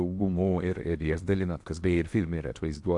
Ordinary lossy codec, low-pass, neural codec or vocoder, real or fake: AAC, 64 kbps; 10.8 kHz; codec, 16 kHz in and 24 kHz out, 0.8 kbps, FocalCodec, streaming, 65536 codes; fake